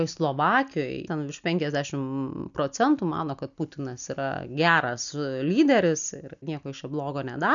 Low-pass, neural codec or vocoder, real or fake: 7.2 kHz; none; real